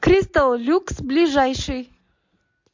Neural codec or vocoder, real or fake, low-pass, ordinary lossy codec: none; real; 7.2 kHz; MP3, 48 kbps